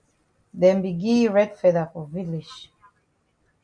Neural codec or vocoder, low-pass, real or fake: none; 9.9 kHz; real